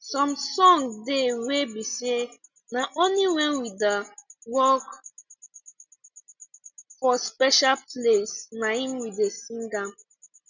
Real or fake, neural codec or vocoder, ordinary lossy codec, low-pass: real; none; none; 7.2 kHz